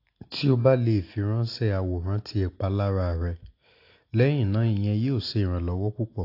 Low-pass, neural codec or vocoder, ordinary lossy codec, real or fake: 5.4 kHz; none; AAC, 32 kbps; real